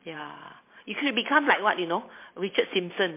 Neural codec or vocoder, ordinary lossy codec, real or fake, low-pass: vocoder, 44.1 kHz, 128 mel bands every 512 samples, BigVGAN v2; MP3, 24 kbps; fake; 3.6 kHz